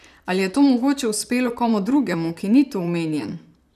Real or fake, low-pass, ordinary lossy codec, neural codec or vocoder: fake; 14.4 kHz; none; vocoder, 44.1 kHz, 128 mel bands, Pupu-Vocoder